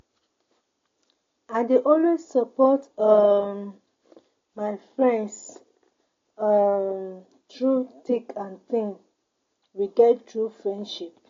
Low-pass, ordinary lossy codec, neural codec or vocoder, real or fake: 7.2 kHz; AAC, 32 kbps; none; real